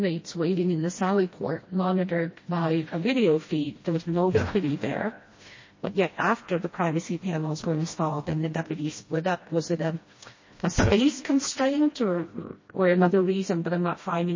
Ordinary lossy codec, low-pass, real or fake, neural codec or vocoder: MP3, 32 kbps; 7.2 kHz; fake; codec, 16 kHz, 1 kbps, FreqCodec, smaller model